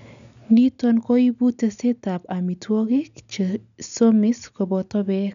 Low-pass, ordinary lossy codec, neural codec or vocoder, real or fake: 7.2 kHz; none; none; real